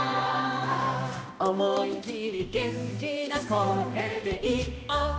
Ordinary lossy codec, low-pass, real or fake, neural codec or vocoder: none; none; fake; codec, 16 kHz, 1 kbps, X-Codec, HuBERT features, trained on balanced general audio